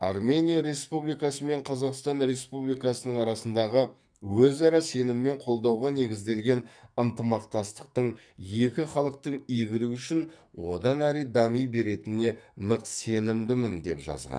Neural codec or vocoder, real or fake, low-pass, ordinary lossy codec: codec, 44.1 kHz, 2.6 kbps, SNAC; fake; 9.9 kHz; none